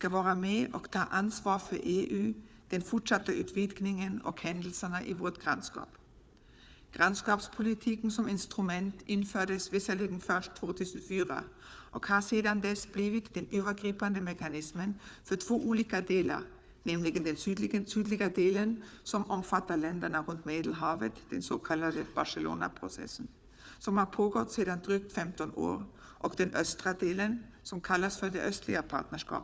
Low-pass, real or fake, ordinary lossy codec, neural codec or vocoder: none; fake; none; codec, 16 kHz, 4 kbps, FunCodec, trained on Chinese and English, 50 frames a second